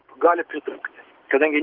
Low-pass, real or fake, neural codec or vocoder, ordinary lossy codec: 5.4 kHz; real; none; Opus, 16 kbps